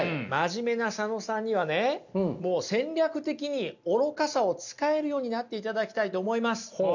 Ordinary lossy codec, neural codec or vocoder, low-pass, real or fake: none; none; 7.2 kHz; real